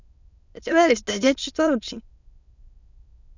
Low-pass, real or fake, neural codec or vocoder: 7.2 kHz; fake; autoencoder, 22.05 kHz, a latent of 192 numbers a frame, VITS, trained on many speakers